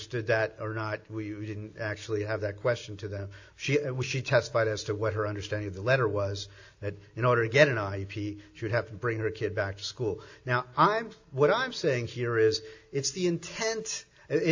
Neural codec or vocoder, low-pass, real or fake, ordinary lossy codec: none; 7.2 kHz; real; AAC, 48 kbps